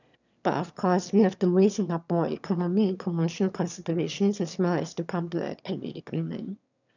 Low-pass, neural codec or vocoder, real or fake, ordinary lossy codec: 7.2 kHz; autoencoder, 22.05 kHz, a latent of 192 numbers a frame, VITS, trained on one speaker; fake; none